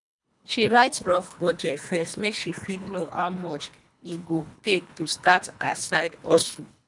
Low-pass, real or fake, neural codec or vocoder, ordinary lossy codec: 10.8 kHz; fake; codec, 24 kHz, 1.5 kbps, HILCodec; none